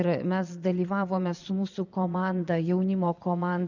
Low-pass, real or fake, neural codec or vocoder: 7.2 kHz; fake; vocoder, 22.05 kHz, 80 mel bands, Vocos